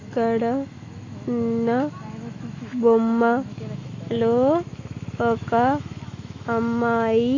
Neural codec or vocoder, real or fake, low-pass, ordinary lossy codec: autoencoder, 48 kHz, 128 numbers a frame, DAC-VAE, trained on Japanese speech; fake; 7.2 kHz; AAC, 32 kbps